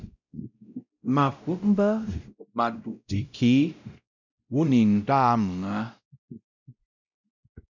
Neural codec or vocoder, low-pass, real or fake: codec, 16 kHz, 0.5 kbps, X-Codec, WavLM features, trained on Multilingual LibriSpeech; 7.2 kHz; fake